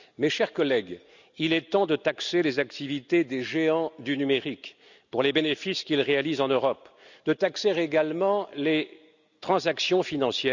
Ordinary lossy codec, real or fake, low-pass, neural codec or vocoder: none; real; 7.2 kHz; none